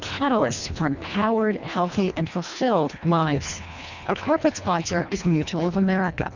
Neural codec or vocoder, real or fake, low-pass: codec, 24 kHz, 1.5 kbps, HILCodec; fake; 7.2 kHz